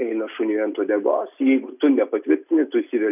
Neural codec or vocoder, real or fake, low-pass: none; real; 3.6 kHz